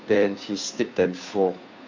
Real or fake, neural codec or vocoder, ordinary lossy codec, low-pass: fake; codec, 16 kHz, 2 kbps, FunCodec, trained on Chinese and English, 25 frames a second; MP3, 48 kbps; 7.2 kHz